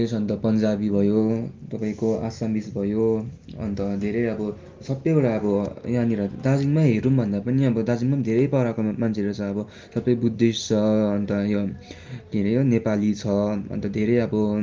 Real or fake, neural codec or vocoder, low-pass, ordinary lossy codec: real; none; 7.2 kHz; Opus, 32 kbps